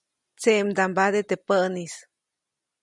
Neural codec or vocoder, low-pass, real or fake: none; 10.8 kHz; real